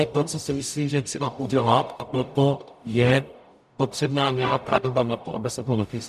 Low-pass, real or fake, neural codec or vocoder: 14.4 kHz; fake; codec, 44.1 kHz, 0.9 kbps, DAC